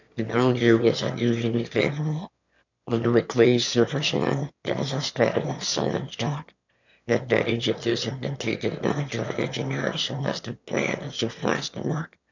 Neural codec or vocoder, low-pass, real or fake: autoencoder, 22.05 kHz, a latent of 192 numbers a frame, VITS, trained on one speaker; 7.2 kHz; fake